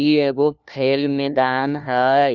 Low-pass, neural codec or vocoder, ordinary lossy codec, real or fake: 7.2 kHz; codec, 16 kHz, 1 kbps, FunCodec, trained on LibriTTS, 50 frames a second; none; fake